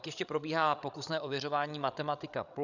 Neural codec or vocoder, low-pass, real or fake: codec, 16 kHz, 16 kbps, FreqCodec, larger model; 7.2 kHz; fake